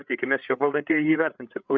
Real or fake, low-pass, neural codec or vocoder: fake; 7.2 kHz; codec, 16 kHz, 8 kbps, FreqCodec, larger model